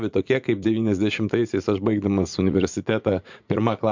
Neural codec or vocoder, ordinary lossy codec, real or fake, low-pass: vocoder, 44.1 kHz, 80 mel bands, Vocos; MP3, 64 kbps; fake; 7.2 kHz